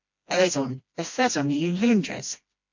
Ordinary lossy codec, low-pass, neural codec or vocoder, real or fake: MP3, 48 kbps; 7.2 kHz; codec, 16 kHz, 1 kbps, FreqCodec, smaller model; fake